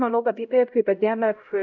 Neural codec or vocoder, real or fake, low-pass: codec, 16 kHz, 0.5 kbps, X-Codec, HuBERT features, trained on LibriSpeech; fake; 7.2 kHz